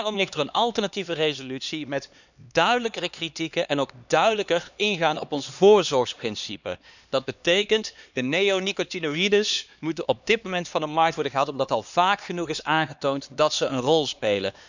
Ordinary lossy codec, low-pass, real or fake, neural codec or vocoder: none; 7.2 kHz; fake; codec, 16 kHz, 2 kbps, X-Codec, HuBERT features, trained on LibriSpeech